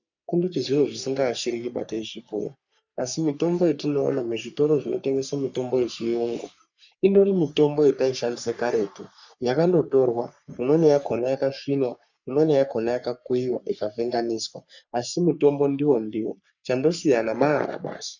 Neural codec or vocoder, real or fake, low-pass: codec, 44.1 kHz, 3.4 kbps, Pupu-Codec; fake; 7.2 kHz